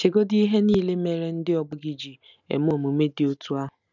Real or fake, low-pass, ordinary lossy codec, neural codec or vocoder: real; 7.2 kHz; none; none